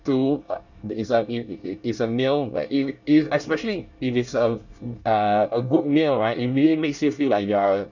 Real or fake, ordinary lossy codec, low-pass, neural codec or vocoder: fake; none; 7.2 kHz; codec, 24 kHz, 1 kbps, SNAC